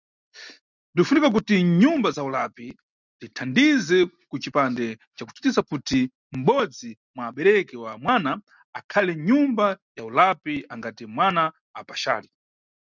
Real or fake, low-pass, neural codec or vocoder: real; 7.2 kHz; none